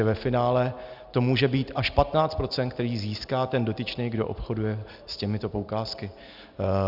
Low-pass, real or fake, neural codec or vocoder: 5.4 kHz; real; none